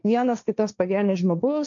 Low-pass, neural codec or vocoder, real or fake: 7.2 kHz; codec, 16 kHz, 1.1 kbps, Voila-Tokenizer; fake